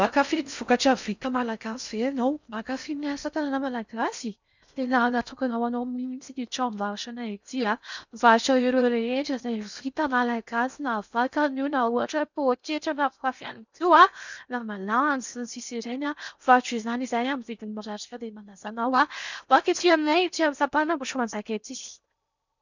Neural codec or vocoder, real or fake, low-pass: codec, 16 kHz in and 24 kHz out, 0.6 kbps, FocalCodec, streaming, 4096 codes; fake; 7.2 kHz